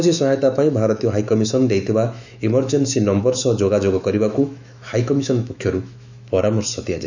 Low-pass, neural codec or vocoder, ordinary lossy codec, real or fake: 7.2 kHz; autoencoder, 48 kHz, 128 numbers a frame, DAC-VAE, trained on Japanese speech; none; fake